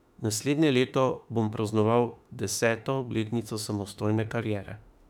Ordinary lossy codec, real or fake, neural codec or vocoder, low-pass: none; fake; autoencoder, 48 kHz, 32 numbers a frame, DAC-VAE, trained on Japanese speech; 19.8 kHz